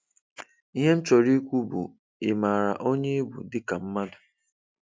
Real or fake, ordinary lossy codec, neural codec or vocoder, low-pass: real; none; none; none